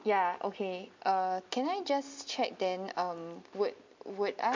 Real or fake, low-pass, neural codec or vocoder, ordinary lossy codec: real; 7.2 kHz; none; MP3, 48 kbps